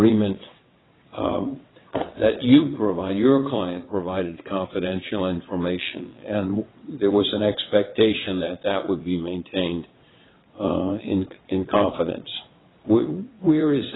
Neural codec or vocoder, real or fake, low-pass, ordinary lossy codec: vocoder, 22.05 kHz, 80 mel bands, WaveNeXt; fake; 7.2 kHz; AAC, 16 kbps